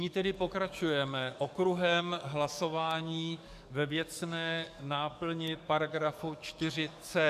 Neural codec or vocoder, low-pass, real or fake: codec, 44.1 kHz, 7.8 kbps, DAC; 14.4 kHz; fake